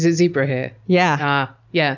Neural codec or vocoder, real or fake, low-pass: none; real; 7.2 kHz